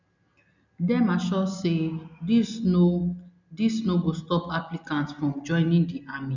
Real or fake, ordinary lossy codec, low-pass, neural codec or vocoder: real; none; 7.2 kHz; none